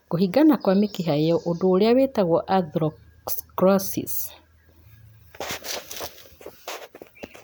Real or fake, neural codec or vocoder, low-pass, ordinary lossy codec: real; none; none; none